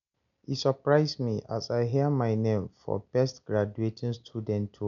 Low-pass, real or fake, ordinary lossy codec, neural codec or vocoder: 7.2 kHz; real; none; none